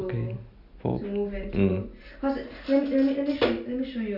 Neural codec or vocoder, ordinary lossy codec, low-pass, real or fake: none; none; 5.4 kHz; real